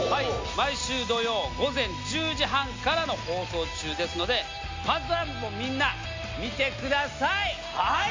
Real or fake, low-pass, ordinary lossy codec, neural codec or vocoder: real; 7.2 kHz; MP3, 48 kbps; none